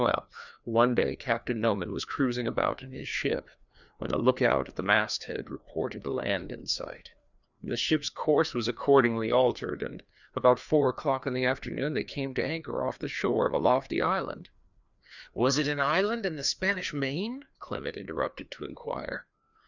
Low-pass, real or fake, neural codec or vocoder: 7.2 kHz; fake; codec, 16 kHz, 2 kbps, FreqCodec, larger model